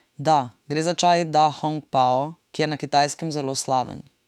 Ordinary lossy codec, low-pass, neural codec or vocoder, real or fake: none; 19.8 kHz; autoencoder, 48 kHz, 32 numbers a frame, DAC-VAE, trained on Japanese speech; fake